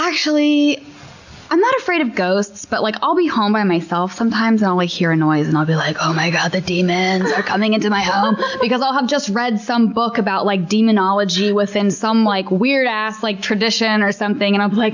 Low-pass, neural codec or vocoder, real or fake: 7.2 kHz; none; real